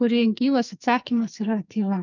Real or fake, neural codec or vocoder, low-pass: fake; codec, 44.1 kHz, 2.6 kbps, SNAC; 7.2 kHz